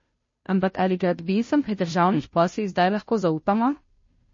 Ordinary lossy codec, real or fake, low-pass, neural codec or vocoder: MP3, 32 kbps; fake; 7.2 kHz; codec, 16 kHz, 0.5 kbps, FunCodec, trained on Chinese and English, 25 frames a second